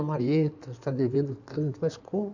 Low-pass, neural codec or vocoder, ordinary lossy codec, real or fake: 7.2 kHz; codec, 16 kHz in and 24 kHz out, 2.2 kbps, FireRedTTS-2 codec; none; fake